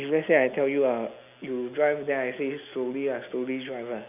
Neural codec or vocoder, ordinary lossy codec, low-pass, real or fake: none; none; 3.6 kHz; real